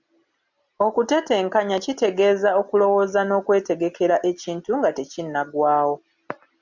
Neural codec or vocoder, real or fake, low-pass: none; real; 7.2 kHz